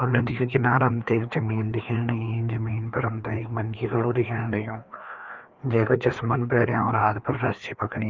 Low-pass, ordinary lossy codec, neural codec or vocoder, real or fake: 7.2 kHz; Opus, 32 kbps; codec, 16 kHz, 2 kbps, FreqCodec, larger model; fake